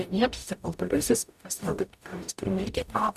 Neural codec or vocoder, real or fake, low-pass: codec, 44.1 kHz, 0.9 kbps, DAC; fake; 14.4 kHz